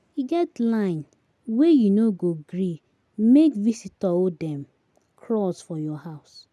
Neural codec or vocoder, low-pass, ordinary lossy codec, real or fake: none; none; none; real